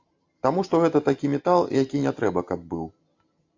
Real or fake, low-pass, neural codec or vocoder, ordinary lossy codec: real; 7.2 kHz; none; AAC, 48 kbps